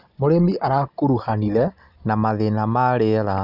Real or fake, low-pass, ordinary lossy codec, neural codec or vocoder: real; 5.4 kHz; none; none